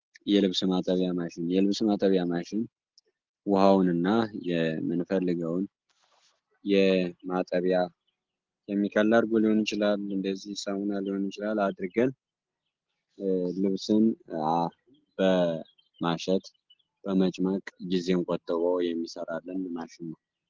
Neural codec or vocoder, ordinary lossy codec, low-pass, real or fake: none; Opus, 16 kbps; 7.2 kHz; real